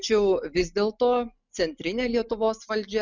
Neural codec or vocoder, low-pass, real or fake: none; 7.2 kHz; real